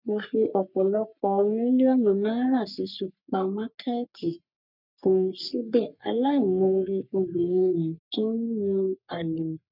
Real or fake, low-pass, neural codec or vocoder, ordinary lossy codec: fake; 5.4 kHz; codec, 44.1 kHz, 3.4 kbps, Pupu-Codec; none